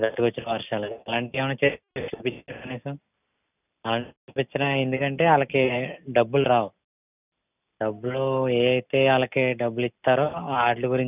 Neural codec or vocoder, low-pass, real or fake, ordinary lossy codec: none; 3.6 kHz; real; none